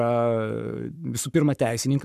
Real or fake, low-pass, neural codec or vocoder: fake; 14.4 kHz; codec, 44.1 kHz, 7.8 kbps, Pupu-Codec